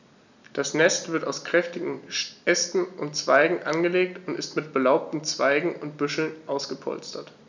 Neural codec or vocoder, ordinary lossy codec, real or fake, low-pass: none; none; real; 7.2 kHz